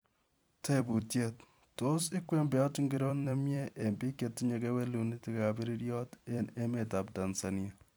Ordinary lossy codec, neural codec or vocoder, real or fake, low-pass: none; vocoder, 44.1 kHz, 128 mel bands every 256 samples, BigVGAN v2; fake; none